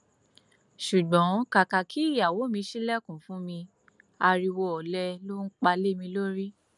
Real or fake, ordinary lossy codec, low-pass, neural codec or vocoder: real; none; 10.8 kHz; none